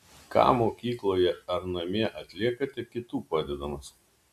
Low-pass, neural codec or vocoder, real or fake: 14.4 kHz; none; real